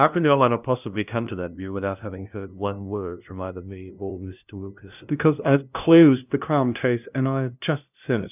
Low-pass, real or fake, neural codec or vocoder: 3.6 kHz; fake; codec, 16 kHz, 0.5 kbps, FunCodec, trained on LibriTTS, 25 frames a second